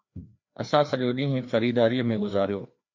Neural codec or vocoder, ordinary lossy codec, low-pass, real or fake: codec, 16 kHz, 2 kbps, FreqCodec, larger model; MP3, 48 kbps; 7.2 kHz; fake